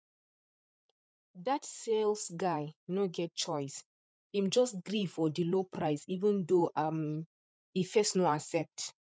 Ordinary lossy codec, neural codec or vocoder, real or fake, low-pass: none; codec, 16 kHz, 8 kbps, FreqCodec, larger model; fake; none